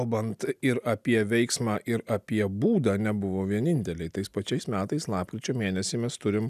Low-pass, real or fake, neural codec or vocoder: 14.4 kHz; real; none